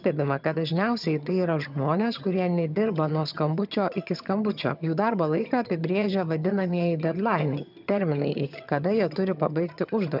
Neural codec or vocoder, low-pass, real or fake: vocoder, 22.05 kHz, 80 mel bands, HiFi-GAN; 5.4 kHz; fake